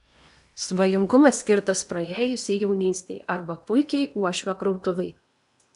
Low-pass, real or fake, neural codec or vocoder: 10.8 kHz; fake; codec, 16 kHz in and 24 kHz out, 0.8 kbps, FocalCodec, streaming, 65536 codes